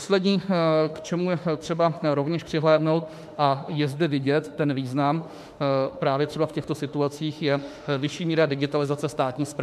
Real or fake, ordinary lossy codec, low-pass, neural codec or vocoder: fake; MP3, 96 kbps; 14.4 kHz; autoencoder, 48 kHz, 32 numbers a frame, DAC-VAE, trained on Japanese speech